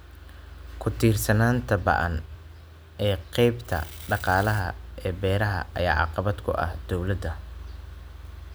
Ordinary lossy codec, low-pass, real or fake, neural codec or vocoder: none; none; real; none